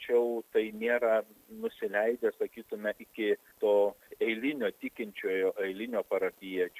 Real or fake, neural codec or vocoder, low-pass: real; none; 14.4 kHz